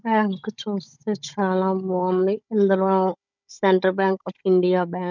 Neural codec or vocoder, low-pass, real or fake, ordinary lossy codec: codec, 16 kHz, 16 kbps, FunCodec, trained on Chinese and English, 50 frames a second; 7.2 kHz; fake; none